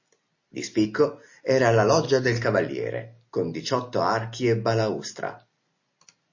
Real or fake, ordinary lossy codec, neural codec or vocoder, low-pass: real; MP3, 32 kbps; none; 7.2 kHz